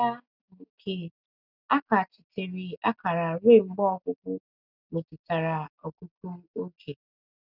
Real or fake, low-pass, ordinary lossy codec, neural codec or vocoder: real; 5.4 kHz; none; none